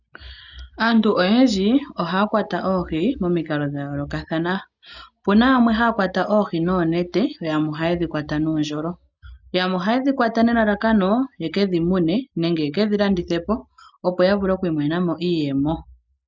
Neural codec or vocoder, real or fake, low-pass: none; real; 7.2 kHz